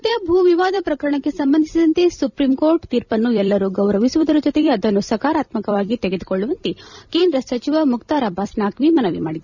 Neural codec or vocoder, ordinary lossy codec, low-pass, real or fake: vocoder, 44.1 kHz, 128 mel bands every 256 samples, BigVGAN v2; none; 7.2 kHz; fake